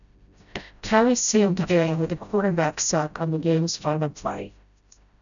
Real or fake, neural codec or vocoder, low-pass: fake; codec, 16 kHz, 0.5 kbps, FreqCodec, smaller model; 7.2 kHz